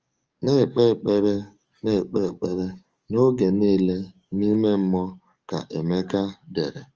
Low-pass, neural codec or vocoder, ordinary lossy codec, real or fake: 7.2 kHz; codec, 44.1 kHz, 7.8 kbps, DAC; Opus, 24 kbps; fake